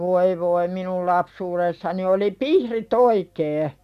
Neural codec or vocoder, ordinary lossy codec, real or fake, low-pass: none; none; real; 14.4 kHz